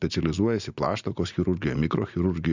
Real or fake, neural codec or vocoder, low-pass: real; none; 7.2 kHz